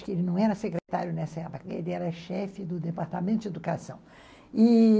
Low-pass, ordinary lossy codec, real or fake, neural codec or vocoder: none; none; real; none